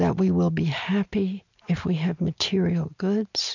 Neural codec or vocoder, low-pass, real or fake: none; 7.2 kHz; real